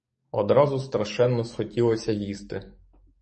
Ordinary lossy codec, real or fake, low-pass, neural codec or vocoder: MP3, 32 kbps; fake; 10.8 kHz; codec, 44.1 kHz, 7.8 kbps, DAC